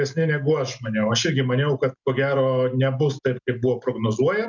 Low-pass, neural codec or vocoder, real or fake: 7.2 kHz; none; real